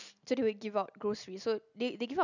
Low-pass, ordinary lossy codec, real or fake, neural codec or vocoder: 7.2 kHz; none; real; none